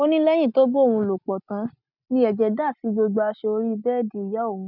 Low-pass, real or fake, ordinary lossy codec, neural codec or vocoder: 5.4 kHz; real; none; none